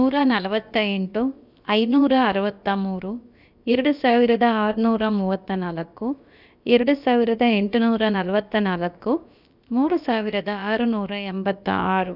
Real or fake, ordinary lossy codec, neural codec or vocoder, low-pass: fake; none; codec, 16 kHz, about 1 kbps, DyCAST, with the encoder's durations; 5.4 kHz